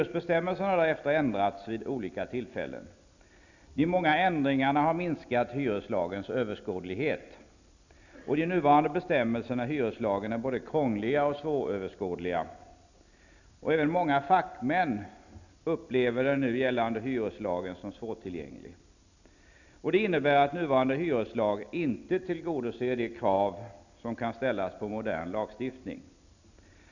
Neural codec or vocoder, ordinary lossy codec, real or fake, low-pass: none; none; real; 7.2 kHz